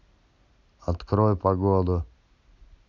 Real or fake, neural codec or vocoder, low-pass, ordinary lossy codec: real; none; 7.2 kHz; none